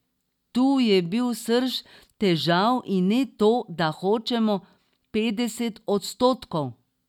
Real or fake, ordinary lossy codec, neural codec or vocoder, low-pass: real; none; none; 19.8 kHz